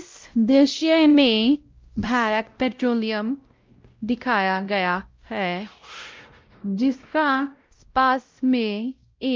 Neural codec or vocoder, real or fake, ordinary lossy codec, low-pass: codec, 16 kHz, 0.5 kbps, X-Codec, WavLM features, trained on Multilingual LibriSpeech; fake; Opus, 32 kbps; 7.2 kHz